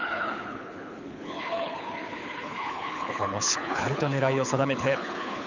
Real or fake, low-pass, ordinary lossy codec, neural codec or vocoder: fake; 7.2 kHz; none; codec, 24 kHz, 6 kbps, HILCodec